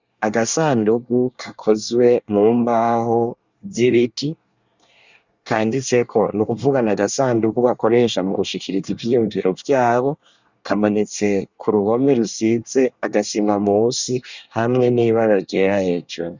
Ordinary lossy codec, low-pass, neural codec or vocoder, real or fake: Opus, 64 kbps; 7.2 kHz; codec, 24 kHz, 1 kbps, SNAC; fake